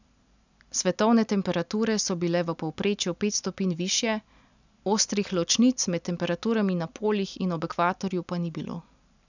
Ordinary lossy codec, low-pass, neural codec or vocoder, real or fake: none; 7.2 kHz; none; real